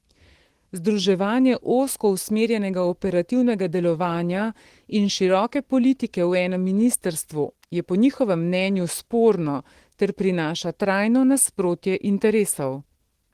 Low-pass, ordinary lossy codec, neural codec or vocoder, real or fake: 14.4 kHz; Opus, 16 kbps; autoencoder, 48 kHz, 128 numbers a frame, DAC-VAE, trained on Japanese speech; fake